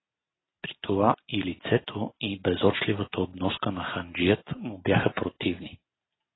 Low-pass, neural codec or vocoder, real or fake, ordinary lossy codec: 7.2 kHz; none; real; AAC, 16 kbps